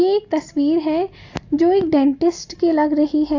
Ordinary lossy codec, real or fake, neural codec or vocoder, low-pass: AAC, 48 kbps; real; none; 7.2 kHz